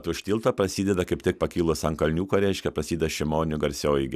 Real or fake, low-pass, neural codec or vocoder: real; 14.4 kHz; none